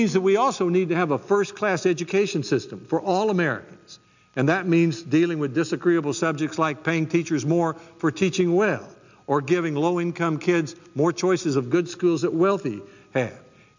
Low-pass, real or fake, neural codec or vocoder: 7.2 kHz; real; none